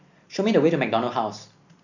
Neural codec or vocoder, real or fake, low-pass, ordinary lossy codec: none; real; 7.2 kHz; none